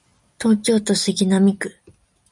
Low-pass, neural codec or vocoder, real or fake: 10.8 kHz; none; real